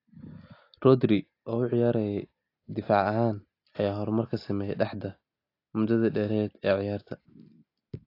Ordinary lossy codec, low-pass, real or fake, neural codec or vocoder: AAC, 32 kbps; 5.4 kHz; real; none